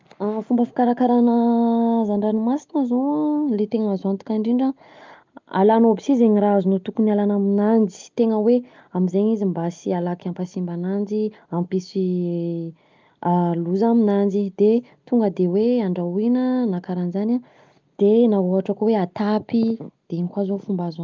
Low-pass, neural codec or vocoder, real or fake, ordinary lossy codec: 7.2 kHz; none; real; Opus, 24 kbps